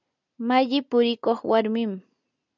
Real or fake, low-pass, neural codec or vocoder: real; 7.2 kHz; none